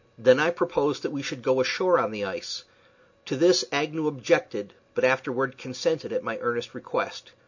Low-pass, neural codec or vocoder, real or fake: 7.2 kHz; none; real